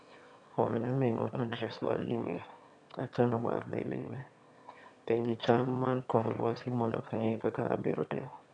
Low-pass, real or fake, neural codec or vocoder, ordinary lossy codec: 9.9 kHz; fake; autoencoder, 22.05 kHz, a latent of 192 numbers a frame, VITS, trained on one speaker; none